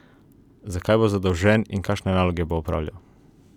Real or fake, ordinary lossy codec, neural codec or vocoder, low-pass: real; none; none; 19.8 kHz